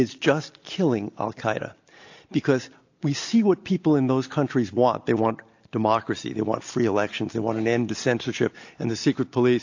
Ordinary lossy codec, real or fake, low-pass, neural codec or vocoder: AAC, 48 kbps; real; 7.2 kHz; none